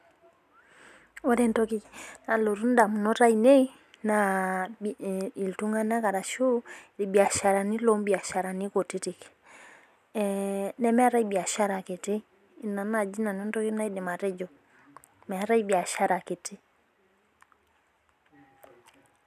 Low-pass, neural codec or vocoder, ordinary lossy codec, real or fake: 14.4 kHz; none; none; real